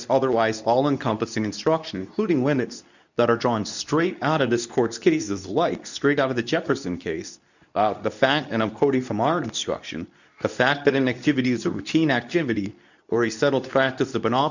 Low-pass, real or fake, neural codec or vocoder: 7.2 kHz; fake; codec, 24 kHz, 0.9 kbps, WavTokenizer, medium speech release version 2